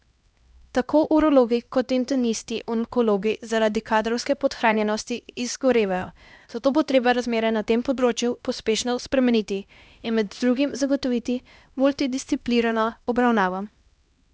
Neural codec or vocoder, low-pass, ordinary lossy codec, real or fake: codec, 16 kHz, 1 kbps, X-Codec, HuBERT features, trained on LibriSpeech; none; none; fake